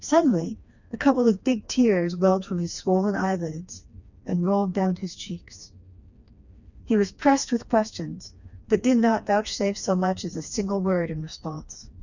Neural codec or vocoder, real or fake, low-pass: codec, 16 kHz, 2 kbps, FreqCodec, smaller model; fake; 7.2 kHz